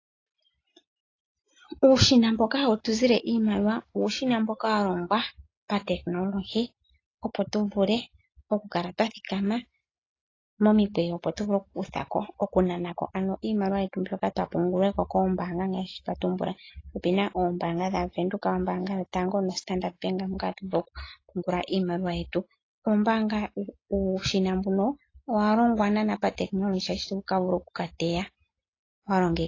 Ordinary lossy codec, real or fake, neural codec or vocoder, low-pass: AAC, 32 kbps; real; none; 7.2 kHz